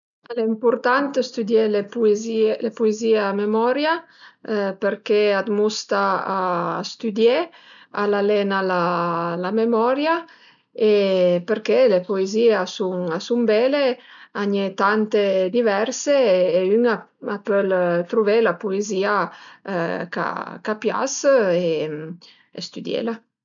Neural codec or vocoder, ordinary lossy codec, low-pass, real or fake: none; none; 7.2 kHz; real